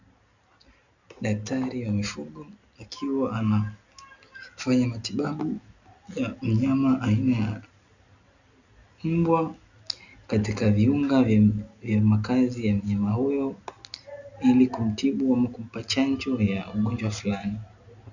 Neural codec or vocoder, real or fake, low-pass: none; real; 7.2 kHz